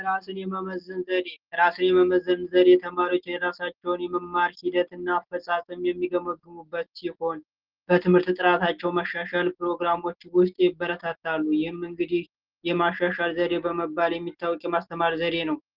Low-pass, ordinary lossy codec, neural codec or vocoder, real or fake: 5.4 kHz; Opus, 16 kbps; none; real